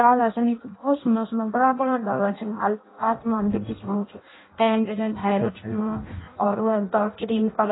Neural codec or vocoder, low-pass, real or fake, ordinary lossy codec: codec, 16 kHz in and 24 kHz out, 0.6 kbps, FireRedTTS-2 codec; 7.2 kHz; fake; AAC, 16 kbps